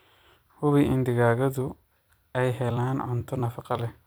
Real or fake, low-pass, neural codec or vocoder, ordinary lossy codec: fake; none; vocoder, 44.1 kHz, 128 mel bands every 256 samples, BigVGAN v2; none